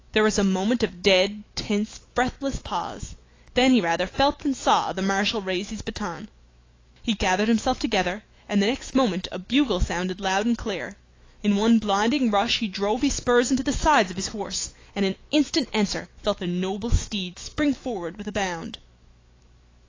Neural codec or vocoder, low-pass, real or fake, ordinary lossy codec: autoencoder, 48 kHz, 128 numbers a frame, DAC-VAE, trained on Japanese speech; 7.2 kHz; fake; AAC, 32 kbps